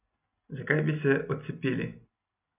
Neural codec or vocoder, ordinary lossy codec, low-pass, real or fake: none; none; 3.6 kHz; real